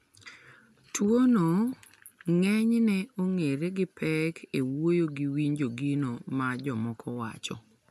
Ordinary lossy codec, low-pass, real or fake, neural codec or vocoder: MP3, 96 kbps; 14.4 kHz; real; none